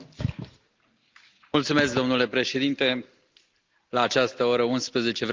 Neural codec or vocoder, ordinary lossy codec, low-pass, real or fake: none; Opus, 24 kbps; 7.2 kHz; real